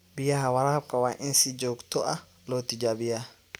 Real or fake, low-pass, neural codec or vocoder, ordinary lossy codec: real; none; none; none